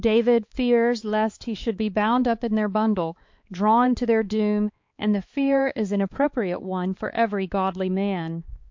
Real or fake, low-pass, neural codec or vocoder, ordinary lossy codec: fake; 7.2 kHz; codec, 16 kHz, 4 kbps, X-Codec, HuBERT features, trained on balanced general audio; MP3, 48 kbps